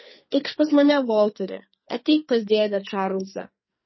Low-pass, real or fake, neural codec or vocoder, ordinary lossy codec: 7.2 kHz; fake; codec, 44.1 kHz, 2.6 kbps, SNAC; MP3, 24 kbps